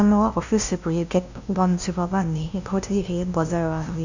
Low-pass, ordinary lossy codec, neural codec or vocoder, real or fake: 7.2 kHz; none; codec, 16 kHz, 0.5 kbps, FunCodec, trained on LibriTTS, 25 frames a second; fake